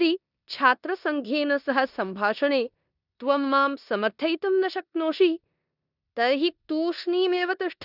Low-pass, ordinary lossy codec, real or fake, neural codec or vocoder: 5.4 kHz; none; fake; codec, 16 kHz in and 24 kHz out, 0.9 kbps, LongCat-Audio-Codec, four codebook decoder